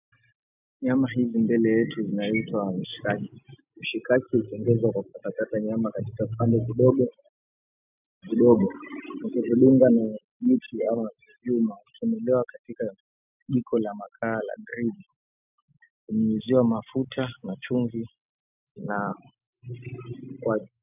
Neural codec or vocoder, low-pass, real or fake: none; 3.6 kHz; real